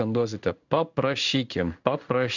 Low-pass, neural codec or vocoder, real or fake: 7.2 kHz; codec, 16 kHz in and 24 kHz out, 1 kbps, XY-Tokenizer; fake